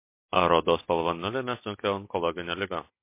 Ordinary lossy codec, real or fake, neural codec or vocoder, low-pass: MP3, 24 kbps; real; none; 3.6 kHz